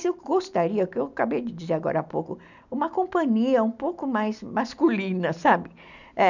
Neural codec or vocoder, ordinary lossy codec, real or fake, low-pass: none; none; real; 7.2 kHz